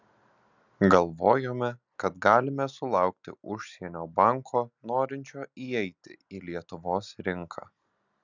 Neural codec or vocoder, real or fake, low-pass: none; real; 7.2 kHz